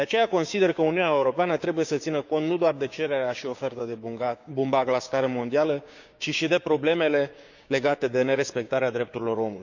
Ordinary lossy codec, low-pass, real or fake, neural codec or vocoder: none; 7.2 kHz; fake; codec, 16 kHz, 6 kbps, DAC